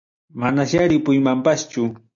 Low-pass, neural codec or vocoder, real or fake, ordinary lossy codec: 7.2 kHz; none; real; AAC, 64 kbps